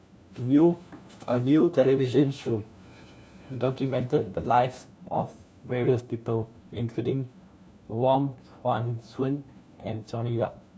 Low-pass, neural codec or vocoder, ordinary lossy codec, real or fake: none; codec, 16 kHz, 1 kbps, FunCodec, trained on LibriTTS, 50 frames a second; none; fake